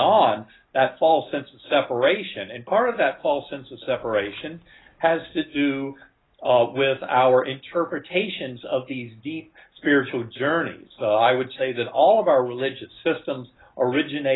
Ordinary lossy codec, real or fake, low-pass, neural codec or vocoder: AAC, 16 kbps; real; 7.2 kHz; none